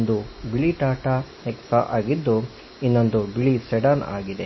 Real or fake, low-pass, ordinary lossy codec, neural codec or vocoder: real; 7.2 kHz; MP3, 24 kbps; none